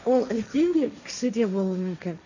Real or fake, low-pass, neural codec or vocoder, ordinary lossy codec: fake; 7.2 kHz; codec, 16 kHz, 1.1 kbps, Voila-Tokenizer; none